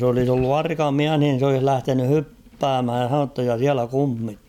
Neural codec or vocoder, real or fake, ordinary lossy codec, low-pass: none; real; none; 19.8 kHz